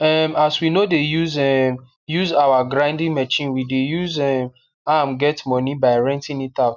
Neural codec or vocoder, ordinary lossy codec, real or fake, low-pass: none; none; real; 7.2 kHz